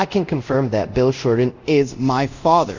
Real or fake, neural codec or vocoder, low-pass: fake; codec, 24 kHz, 0.5 kbps, DualCodec; 7.2 kHz